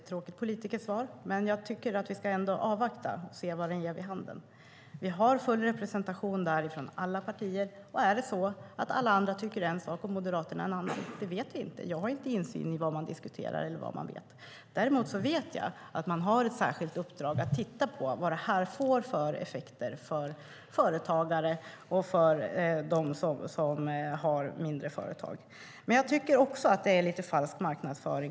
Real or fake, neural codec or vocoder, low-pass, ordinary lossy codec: real; none; none; none